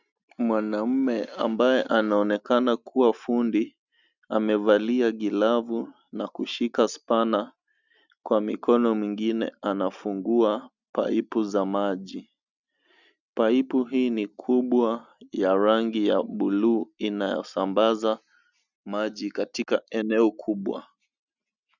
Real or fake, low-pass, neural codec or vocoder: real; 7.2 kHz; none